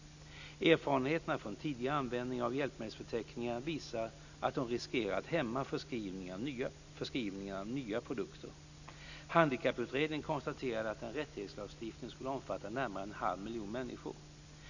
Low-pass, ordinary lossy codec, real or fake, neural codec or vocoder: 7.2 kHz; none; real; none